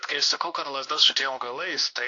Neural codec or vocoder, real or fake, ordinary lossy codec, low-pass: codec, 16 kHz, 1 kbps, X-Codec, WavLM features, trained on Multilingual LibriSpeech; fake; AAC, 48 kbps; 7.2 kHz